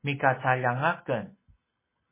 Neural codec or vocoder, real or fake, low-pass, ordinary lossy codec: none; real; 3.6 kHz; MP3, 16 kbps